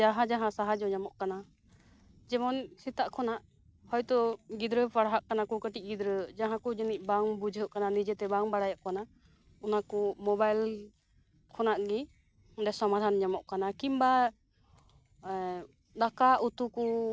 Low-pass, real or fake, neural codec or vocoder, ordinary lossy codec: none; real; none; none